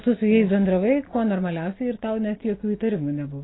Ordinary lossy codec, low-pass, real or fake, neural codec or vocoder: AAC, 16 kbps; 7.2 kHz; real; none